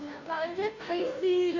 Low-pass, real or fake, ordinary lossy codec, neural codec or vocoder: 7.2 kHz; fake; none; codec, 16 kHz, 0.5 kbps, FunCodec, trained on Chinese and English, 25 frames a second